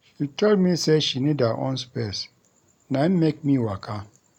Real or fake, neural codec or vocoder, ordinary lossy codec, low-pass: real; none; none; 19.8 kHz